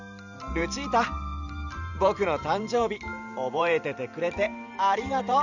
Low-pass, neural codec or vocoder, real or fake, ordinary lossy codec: 7.2 kHz; vocoder, 44.1 kHz, 128 mel bands every 512 samples, BigVGAN v2; fake; none